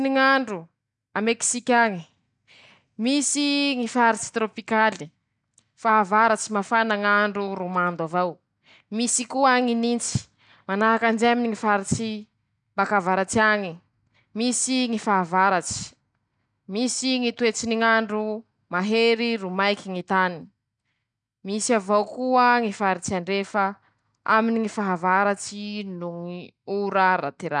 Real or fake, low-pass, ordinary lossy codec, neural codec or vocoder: real; 9.9 kHz; none; none